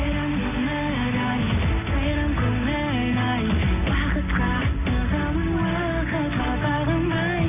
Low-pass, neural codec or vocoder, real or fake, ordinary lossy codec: 3.6 kHz; none; real; none